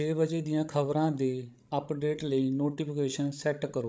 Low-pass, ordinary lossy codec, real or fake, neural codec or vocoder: none; none; fake; codec, 16 kHz, 16 kbps, FreqCodec, larger model